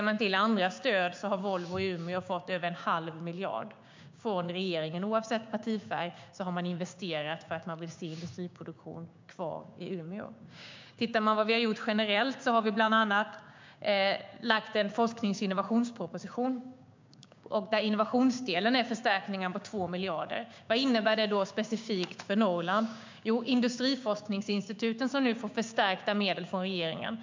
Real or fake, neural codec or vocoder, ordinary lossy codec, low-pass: fake; codec, 16 kHz, 6 kbps, DAC; MP3, 64 kbps; 7.2 kHz